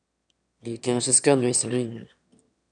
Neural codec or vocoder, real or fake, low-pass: autoencoder, 22.05 kHz, a latent of 192 numbers a frame, VITS, trained on one speaker; fake; 9.9 kHz